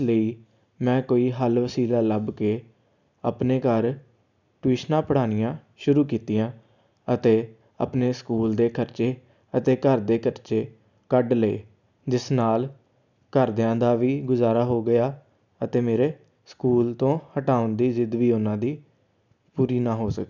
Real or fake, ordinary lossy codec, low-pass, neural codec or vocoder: real; none; 7.2 kHz; none